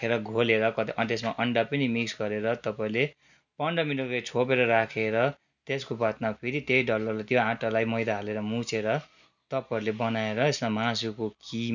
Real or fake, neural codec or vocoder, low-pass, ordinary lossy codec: real; none; 7.2 kHz; none